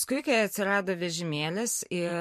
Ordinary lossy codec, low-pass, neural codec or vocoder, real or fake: MP3, 64 kbps; 14.4 kHz; vocoder, 44.1 kHz, 128 mel bands every 512 samples, BigVGAN v2; fake